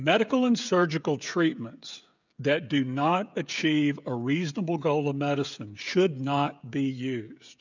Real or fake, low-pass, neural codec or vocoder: fake; 7.2 kHz; codec, 16 kHz, 16 kbps, FreqCodec, smaller model